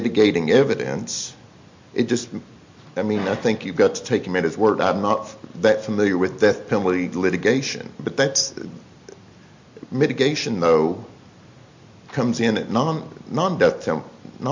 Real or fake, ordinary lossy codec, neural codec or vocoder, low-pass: real; MP3, 48 kbps; none; 7.2 kHz